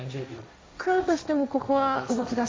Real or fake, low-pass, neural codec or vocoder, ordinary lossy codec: fake; none; codec, 16 kHz, 1.1 kbps, Voila-Tokenizer; none